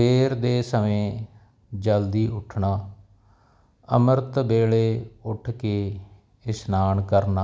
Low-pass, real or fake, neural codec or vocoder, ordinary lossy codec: none; real; none; none